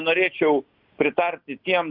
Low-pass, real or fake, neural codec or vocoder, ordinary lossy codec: 5.4 kHz; real; none; Opus, 64 kbps